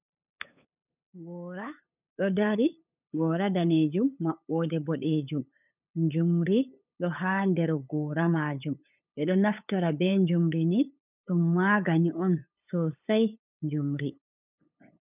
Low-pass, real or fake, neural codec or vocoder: 3.6 kHz; fake; codec, 16 kHz, 8 kbps, FunCodec, trained on LibriTTS, 25 frames a second